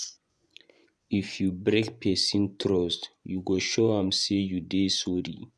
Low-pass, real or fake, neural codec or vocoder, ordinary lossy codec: none; fake; vocoder, 24 kHz, 100 mel bands, Vocos; none